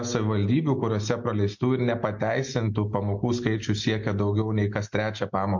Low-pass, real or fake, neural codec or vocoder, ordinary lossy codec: 7.2 kHz; real; none; MP3, 48 kbps